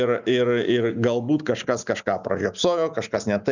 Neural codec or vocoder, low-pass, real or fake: none; 7.2 kHz; real